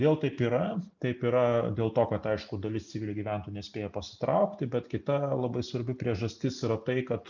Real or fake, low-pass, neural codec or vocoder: real; 7.2 kHz; none